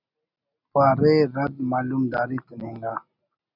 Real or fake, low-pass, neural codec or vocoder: real; 5.4 kHz; none